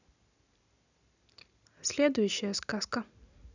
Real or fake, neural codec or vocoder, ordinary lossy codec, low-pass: real; none; none; 7.2 kHz